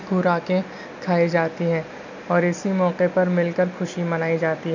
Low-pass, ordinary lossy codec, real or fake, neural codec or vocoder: 7.2 kHz; none; real; none